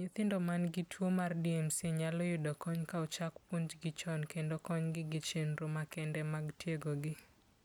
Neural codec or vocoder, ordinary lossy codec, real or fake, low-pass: none; none; real; none